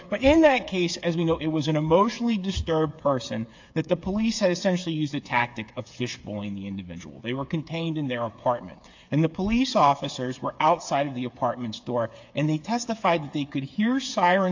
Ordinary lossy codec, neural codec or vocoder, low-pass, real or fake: AAC, 48 kbps; codec, 16 kHz, 8 kbps, FreqCodec, smaller model; 7.2 kHz; fake